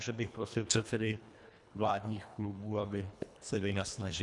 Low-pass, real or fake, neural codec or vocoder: 10.8 kHz; fake; codec, 24 kHz, 1.5 kbps, HILCodec